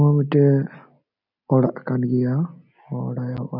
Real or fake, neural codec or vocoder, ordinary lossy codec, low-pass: real; none; none; 5.4 kHz